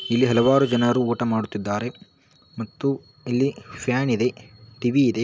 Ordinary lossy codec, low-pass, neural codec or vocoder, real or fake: none; none; none; real